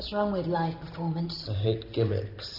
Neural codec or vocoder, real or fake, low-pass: none; real; 5.4 kHz